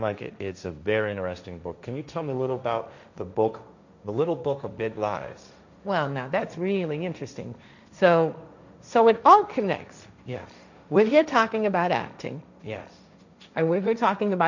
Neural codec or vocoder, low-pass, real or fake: codec, 16 kHz, 1.1 kbps, Voila-Tokenizer; 7.2 kHz; fake